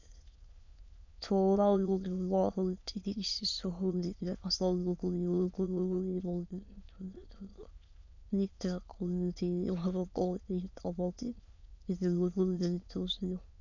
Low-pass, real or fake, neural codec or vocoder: 7.2 kHz; fake; autoencoder, 22.05 kHz, a latent of 192 numbers a frame, VITS, trained on many speakers